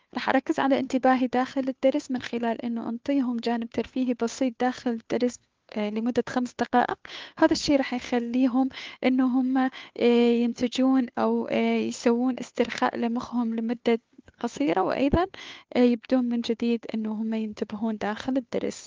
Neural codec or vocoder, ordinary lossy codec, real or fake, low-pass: codec, 16 kHz, 2 kbps, FunCodec, trained on Chinese and English, 25 frames a second; Opus, 24 kbps; fake; 7.2 kHz